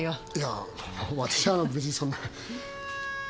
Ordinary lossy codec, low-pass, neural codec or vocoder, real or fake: none; none; none; real